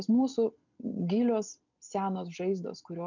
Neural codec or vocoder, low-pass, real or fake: none; 7.2 kHz; real